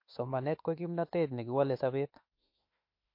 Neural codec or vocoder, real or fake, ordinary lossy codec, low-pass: autoencoder, 48 kHz, 32 numbers a frame, DAC-VAE, trained on Japanese speech; fake; MP3, 32 kbps; 5.4 kHz